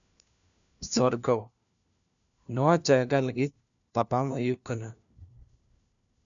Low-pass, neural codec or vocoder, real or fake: 7.2 kHz; codec, 16 kHz, 1 kbps, FunCodec, trained on LibriTTS, 50 frames a second; fake